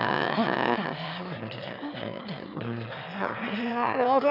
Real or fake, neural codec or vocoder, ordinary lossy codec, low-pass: fake; autoencoder, 22.05 kHz, a latent of 192 numbers a frame, VITS, trained on one speaker; none; 5.4 kHz